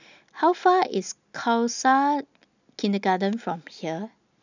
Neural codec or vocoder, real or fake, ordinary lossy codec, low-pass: none; real; none; 7.2 kHz